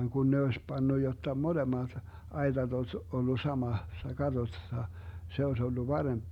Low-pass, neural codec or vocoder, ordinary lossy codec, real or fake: 19.8 kHz; none; Opus, 64 kbps; real